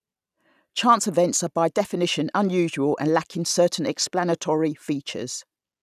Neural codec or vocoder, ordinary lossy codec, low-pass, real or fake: none; none; 14.4 kHz; real